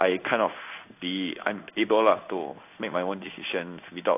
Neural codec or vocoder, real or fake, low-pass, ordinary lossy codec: codec, 16 kHz in and 24 kHz out, 1 kbps, XY-Tokenizer; fake; 3.6 kHz; AAC, 32 kbps